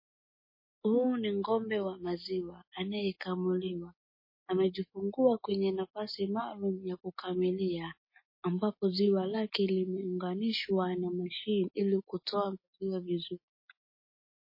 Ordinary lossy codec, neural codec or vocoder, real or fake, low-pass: MP3, 24 kbps; none; real; 5.4 kHz